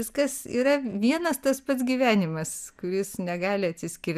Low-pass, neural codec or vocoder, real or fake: 14.4 kHz; none; real